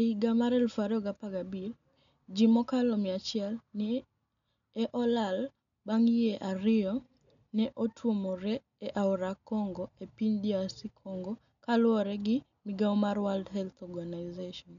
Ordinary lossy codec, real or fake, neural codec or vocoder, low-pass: MP3, 96 kbps; real; none; 7.2 kHz